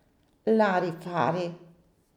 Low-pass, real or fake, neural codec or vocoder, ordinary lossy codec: 19.8 kHz; real; none; none